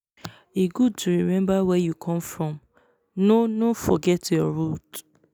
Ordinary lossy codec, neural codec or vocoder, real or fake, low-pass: none; none; real; none